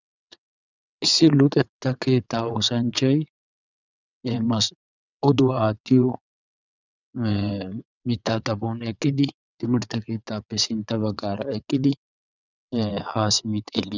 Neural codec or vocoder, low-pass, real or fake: vocoder, 22.05 kHz, 80 mel bands, WaveNeXt; 7.2 kHz; fake